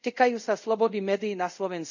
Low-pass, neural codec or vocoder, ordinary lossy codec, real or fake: 7.2 kHz; codec, 24 kHz, 0.5 kbps, DualCodec; none; fake